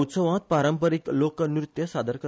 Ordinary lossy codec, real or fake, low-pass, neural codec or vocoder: none; real; none; none